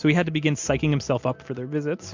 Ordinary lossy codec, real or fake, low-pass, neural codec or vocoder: MP3, 64 kbps; real; 7.2 kHz; none